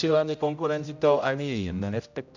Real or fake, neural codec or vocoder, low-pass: fake; codec, 16 kHz, 0.5 kbps, X-Codec, HuBERT features, trained on general audio; 7.2 kHz